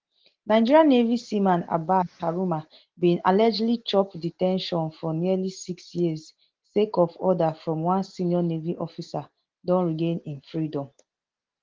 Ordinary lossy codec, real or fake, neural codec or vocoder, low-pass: Opus, 16 kbps; real; none; 7.2 kHz